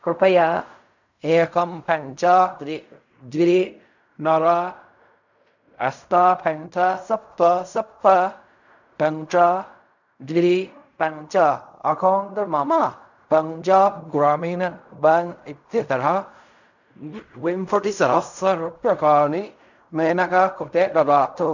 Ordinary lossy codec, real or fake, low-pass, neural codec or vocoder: none; fake; 7.2 kHz; codec, 16 kHz in and 24 kHz out, 0.4 kbps, LongCat-Audio-Codec, fine tuned four codebook decoder